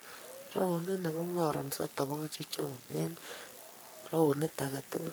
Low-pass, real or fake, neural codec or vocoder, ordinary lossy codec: none; fake; codec, 44.1 kHz, 3.4 kbps, Pupu-Codec; none